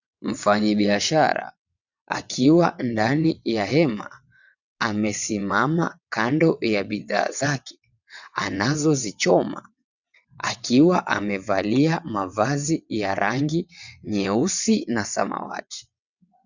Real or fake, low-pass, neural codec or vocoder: fake; 7.2 kHz; vocoder, 22.05 kHz, 80 mel bands, WaveNeXt